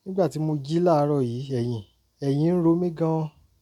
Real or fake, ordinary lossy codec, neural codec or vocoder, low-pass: real; none; none; 19.8 kHz